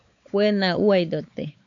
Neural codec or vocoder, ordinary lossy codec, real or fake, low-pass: codec, 16 kHz, 4 kbps, X-Codec, WavLM features, trained on Multilingual LibriSpeech; MP3, 64 kbps; fake; 7.2 kHz